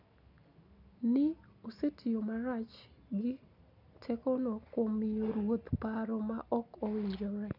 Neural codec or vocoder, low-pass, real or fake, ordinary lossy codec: none; 5.4 kHz; real; none